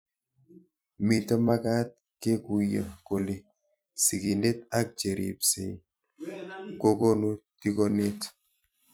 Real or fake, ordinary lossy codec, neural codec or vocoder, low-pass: fake; none; vocoder, 44.1 kHz, 128 mel bands every 256 samples, BigVGAN v2; none